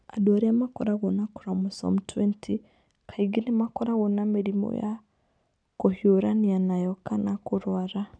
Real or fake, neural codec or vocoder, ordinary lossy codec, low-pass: real; none; none; 9.9 kHz